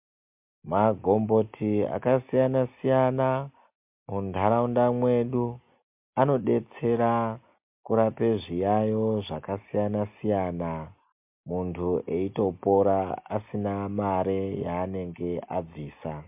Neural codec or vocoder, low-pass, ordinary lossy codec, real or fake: none; 3.6 kHz; MP3, 32 kbps; real